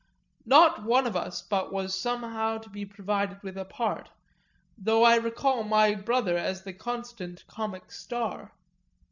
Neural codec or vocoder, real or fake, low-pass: none; real; 7.2 kHz